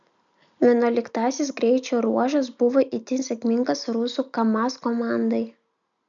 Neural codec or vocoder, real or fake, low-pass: none; real; 7.2 kHz